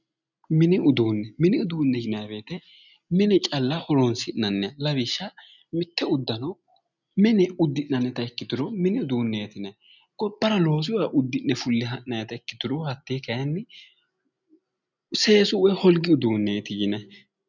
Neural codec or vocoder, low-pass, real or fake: none; 7.2 kHz; real